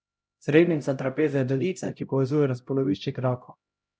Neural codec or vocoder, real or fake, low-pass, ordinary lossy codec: codec, 16 kHz, 0.5 kbps, X-Codec, HuBERT features, trained on LibriSpeech; fake; none; none